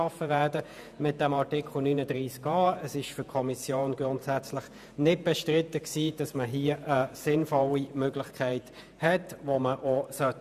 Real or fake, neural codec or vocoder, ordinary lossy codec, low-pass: fake; vocoder, 48 kHz, 128 mel bands, Vocos; none; 14.4 kHz